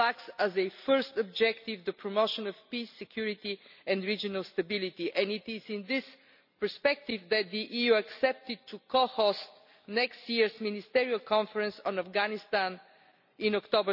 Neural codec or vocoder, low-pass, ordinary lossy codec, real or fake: none; 5.4 kHz; none; real